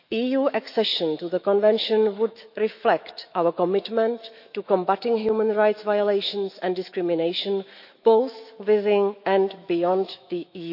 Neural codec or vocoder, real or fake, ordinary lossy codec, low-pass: autoencoder, 48 kHz, 128 numbers a frame, DAC-VAE, trained on Japanese speech; fake; none; 5.4 kHz